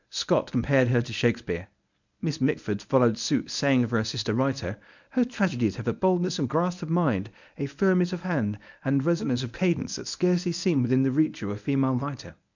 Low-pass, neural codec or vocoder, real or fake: 7.2 kHz; codec, 24 kHz, 0.9 kbps, WavTokenizer, medium speech release version 1; fake